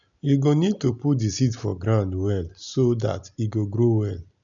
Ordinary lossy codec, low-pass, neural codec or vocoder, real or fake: none; 7.2 kHz; none; real